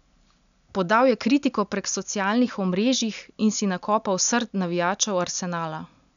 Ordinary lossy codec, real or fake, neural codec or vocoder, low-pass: none; real; none; 7.2 kHz